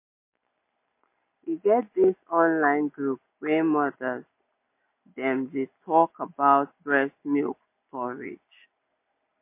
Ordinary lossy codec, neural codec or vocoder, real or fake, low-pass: MP3, 24 kbps; none; real; 3.6 kHz